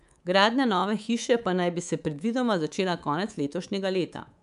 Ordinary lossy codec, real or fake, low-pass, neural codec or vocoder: none; fake; 10.8 kHz; codec, 24 kHz, 3.1 kbps, DualCodec